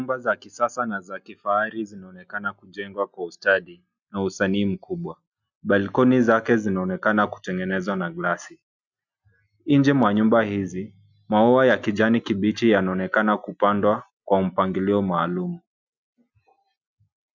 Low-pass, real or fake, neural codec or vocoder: 7.2 kHz; real; none